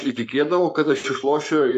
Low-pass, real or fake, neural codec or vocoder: 14.4 kHz; fake; codec, 44.1 kHz, 7.8 kbps, Pupu-Codec